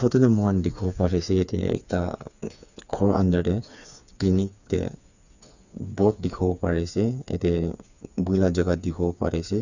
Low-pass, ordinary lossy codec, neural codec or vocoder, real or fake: 7.2 kHz; none; codec, 16 kHz, 4 kbps, FreqCodec, smaller model; fake